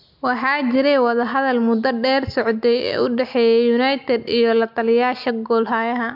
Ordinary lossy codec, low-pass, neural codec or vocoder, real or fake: MP3, 48 kbps; 5.4 kHz; none; real